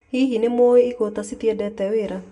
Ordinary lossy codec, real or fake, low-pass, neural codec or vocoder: none; real; 10.8 kHz; none